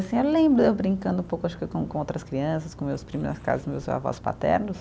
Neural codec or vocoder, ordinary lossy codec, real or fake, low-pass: none; none; real; none